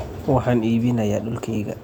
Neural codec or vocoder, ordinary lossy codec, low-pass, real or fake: none; none; 19.8 kHz; real